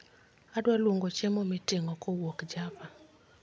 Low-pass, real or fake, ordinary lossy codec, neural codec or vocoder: none; real; none; none